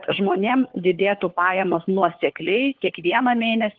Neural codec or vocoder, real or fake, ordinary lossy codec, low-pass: codec, 16 kHz, 4 kbps, FunCodec, trained on LibriTTS, 50 frames a second; fake; Opus, 16 kbps; 7.2 kHz